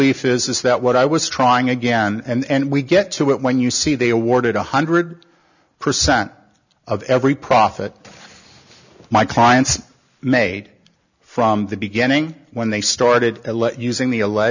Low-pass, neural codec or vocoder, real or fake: 7.2 kHz; none; real